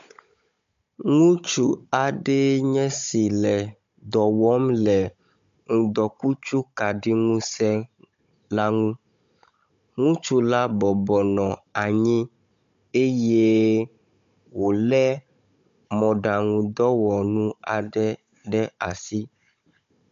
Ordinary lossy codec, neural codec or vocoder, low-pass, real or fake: MP3, 48 kbps; codec, 16 kHz, 16 kbps, FunCodec, trained on Chinese and English, 50 frames a second; 7.2 kHz; fake